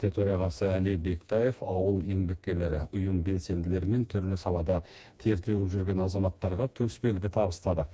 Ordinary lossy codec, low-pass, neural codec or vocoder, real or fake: none; none; codec, 16 kHz, 2 kbps, FreqCodec, smaller model; fake